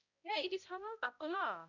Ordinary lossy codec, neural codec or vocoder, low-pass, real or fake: none; codec, 16 kHz, 0.5 kbps, X-Codec, HuBERT features, trained on balanced general audio; 7.2 kHz; fake